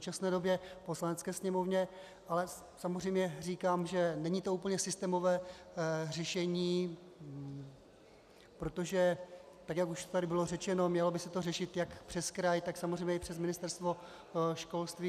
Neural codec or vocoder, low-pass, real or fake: none; 14.4 kHz; real